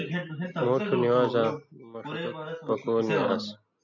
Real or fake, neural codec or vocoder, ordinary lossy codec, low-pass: real; none; MP3, 64 kbps; 7.2 kHz